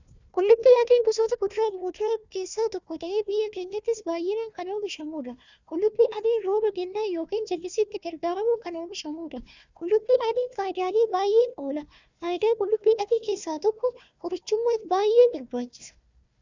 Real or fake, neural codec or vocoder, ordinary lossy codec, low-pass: fake; codec, 16 kHz, 1 kbps, FunCodec, trained on Chinese and English, 50 frames a second; Opus, 64 kbps; 7.2 kHz